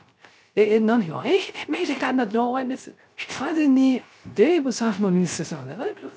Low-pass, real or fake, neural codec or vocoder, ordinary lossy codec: none; fake; codec, 16 kHz, 0.3 kbps, FocalCodec; none